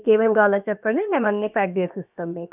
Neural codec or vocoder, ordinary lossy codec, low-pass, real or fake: codec, 16 kHz, about 1 kbps, DyCAST, with the encoder's durations; none; 3.6 kHz; fake